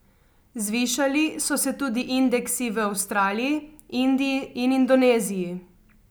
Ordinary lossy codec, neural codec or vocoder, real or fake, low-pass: none; none; real; none